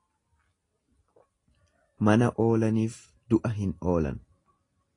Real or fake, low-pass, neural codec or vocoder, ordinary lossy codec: real; 10.8 kHz; none; AAC, 32 kbps